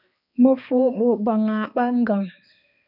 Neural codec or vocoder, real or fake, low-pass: codec, 16 kHz, 2 kbps, X-Codec, HuBERT features, trained on balanced general audio; fake; 5.4 kHz